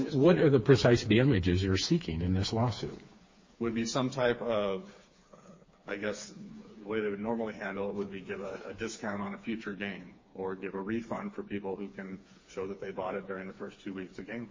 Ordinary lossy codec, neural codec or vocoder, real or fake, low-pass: MP3, 32 kbps; codec, 16 kHz, 4 kbps, FreqCodec, smaller model; fake; 7.2 kHz